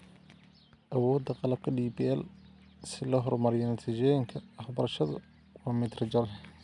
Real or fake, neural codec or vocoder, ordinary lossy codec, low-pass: real; none; none; 10.8 kHz